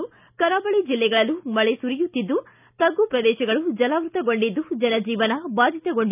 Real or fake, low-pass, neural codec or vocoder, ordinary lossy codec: real; 3.6 kHz; none; none